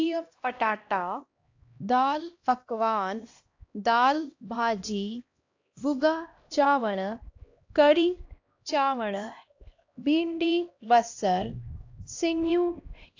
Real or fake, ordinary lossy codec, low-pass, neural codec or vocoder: fake; AAC, 48 kbps; 7.2 kHz; codec, 16 kHz, 1 kbps, X-Codec, HuBERT features, trained on LibriSpeech